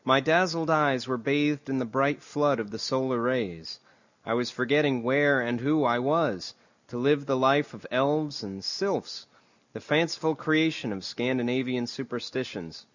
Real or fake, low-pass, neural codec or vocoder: real; 7.2 kHz; none